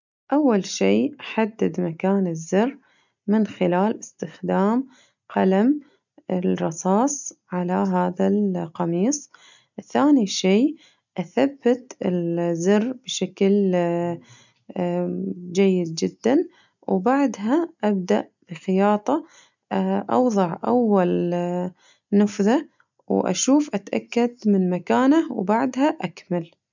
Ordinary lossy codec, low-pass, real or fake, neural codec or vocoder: none; 7.2 kHz; real; none